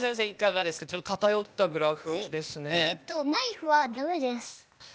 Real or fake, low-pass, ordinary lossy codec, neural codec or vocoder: fake; none; none; codec, 16 kHz, 0.8 kbps, ZipCodec